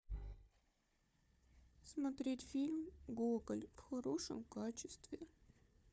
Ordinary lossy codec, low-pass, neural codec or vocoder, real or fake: none; none; codec, 16 kHz, 4 kbps, FunCodec, trained on Chinese and English, 50 frames a second; fake